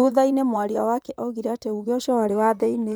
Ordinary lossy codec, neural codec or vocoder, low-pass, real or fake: none; vocoder, 44.1 kHz, 128 mel bands, Pupu-Vocoder; none; fake